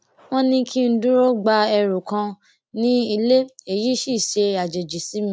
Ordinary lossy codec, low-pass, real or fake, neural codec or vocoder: none; none; real; none